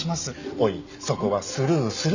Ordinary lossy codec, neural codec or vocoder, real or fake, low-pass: none; none; real; 7.2 kHz